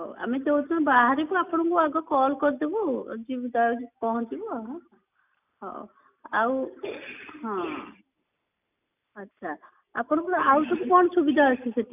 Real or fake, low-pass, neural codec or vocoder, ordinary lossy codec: real; 3.6 kHz; none; none